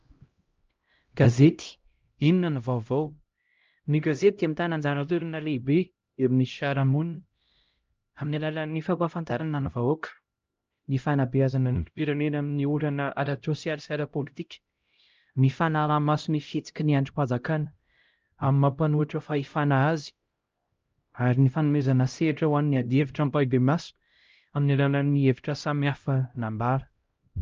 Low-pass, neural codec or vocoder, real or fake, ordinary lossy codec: 7.2 kHz; codec, 16 kHz, 0.5 kbps, X-Codec, HuBERT features, trained on LibriSpeech; fake; Opus, 24 kbps